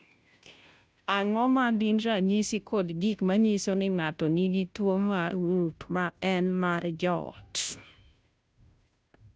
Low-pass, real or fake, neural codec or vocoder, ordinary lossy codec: none; fake; codec, 16 kHz, 0.5 kbps, FunCodec, trained on Chinese and English, 25 frames a second; none